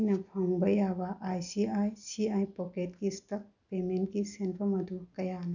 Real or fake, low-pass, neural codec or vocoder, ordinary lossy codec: real; 7.2 kHz; none; none